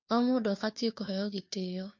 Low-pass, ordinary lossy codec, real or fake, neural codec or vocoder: 7.2 kHz; MP3, 48 kbps; fake; codec, 16 kHz, 2 kbps, FunCodec, trained on Chinese and English, 25 frames a second